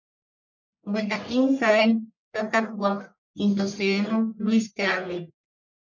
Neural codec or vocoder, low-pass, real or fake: codec, 44.1 kHz, 1.7 kbps, Pupu-Codec; 7.2 kHz; fake